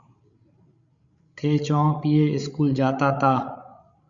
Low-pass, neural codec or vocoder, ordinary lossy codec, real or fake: 7.2 kHz; codec, 16 kHz, 16 kbps, FreqCodec, larger model; Opus, 64 kbps; fake